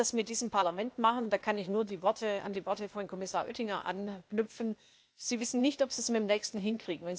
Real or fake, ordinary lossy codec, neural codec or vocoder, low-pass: fake; none; codec, 16 kHz, 0.8 kbps, ZipCodec; none